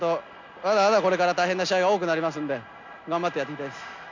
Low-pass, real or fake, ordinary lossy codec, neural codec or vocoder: 7.2 kHz; real; MP3, 64 kbps; none